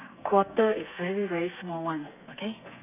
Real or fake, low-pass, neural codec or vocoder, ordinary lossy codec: fake; 3.6 kHz; codec, 32 kHz, 1.9 kbps, SNAC; none